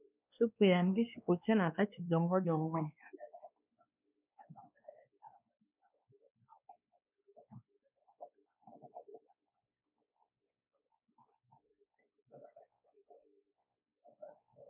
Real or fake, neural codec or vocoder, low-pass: fake; codec, 16 kHz, 2 kbps, X-Codec, WavLM features, trained on Multilingual LibriSpeech; 3.6 kHz